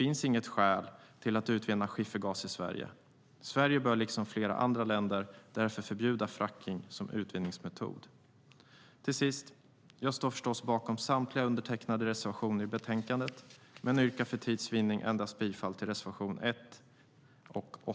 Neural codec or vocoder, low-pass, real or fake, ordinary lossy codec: none; none; real; none